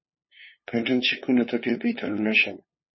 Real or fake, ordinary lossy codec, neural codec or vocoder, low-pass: fake; MP3, 24 kbps; codec, 16 kHz, 2 kbps, FunCodec, trained on LibriTTS, 25 frames a second; 7.2 kHz